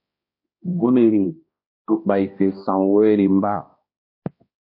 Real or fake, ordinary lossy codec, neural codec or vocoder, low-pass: fake; MP3, 32 kbps; codec, 16 kHz, 1 kbps, X-Codec, HuBERT features, trained on balanced general audio; 5.4 kHz